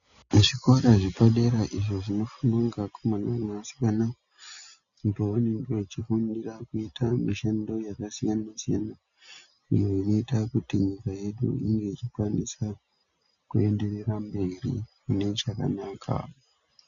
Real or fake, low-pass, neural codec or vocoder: real; 7.2 kHz; none